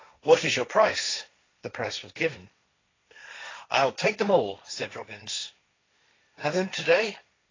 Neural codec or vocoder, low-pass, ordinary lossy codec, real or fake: codec, 16 kHz, 1.1 kbps, Voila-Tokenizer; 7.2 kHz; AAC, 32 kbps; fake